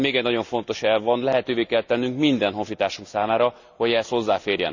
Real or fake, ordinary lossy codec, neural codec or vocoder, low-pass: real; Opus, 64 kbps; none; 7.2 kHz